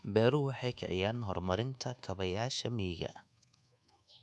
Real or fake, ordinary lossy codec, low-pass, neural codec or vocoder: fake; none; none; codec, 24 kHz, 1.2 kbps, DualCodec